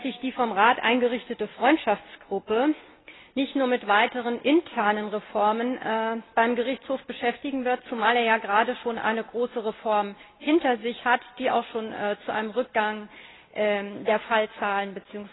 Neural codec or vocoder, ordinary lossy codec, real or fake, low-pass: none; AAC, 16 kbps; real; 7.2 kHz